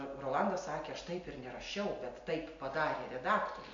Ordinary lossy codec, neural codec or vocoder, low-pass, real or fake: MP3, 48 kbps; none; 7.2 kHz; real